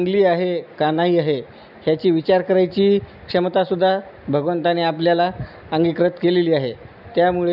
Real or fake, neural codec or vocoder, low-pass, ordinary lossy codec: real; none; 5.4 kHz; none